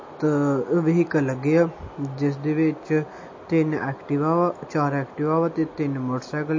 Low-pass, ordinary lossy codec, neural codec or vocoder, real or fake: 7.2 kHz; MP3, 32 kbps; none; real